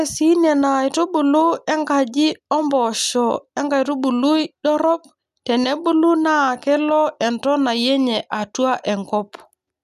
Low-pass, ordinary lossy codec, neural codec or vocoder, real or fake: 14.4 kHz; none; none; real